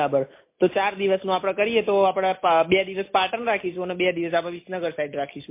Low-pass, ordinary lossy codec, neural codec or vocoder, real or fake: 3.6 kHz; MP3, 24 kbps; none; real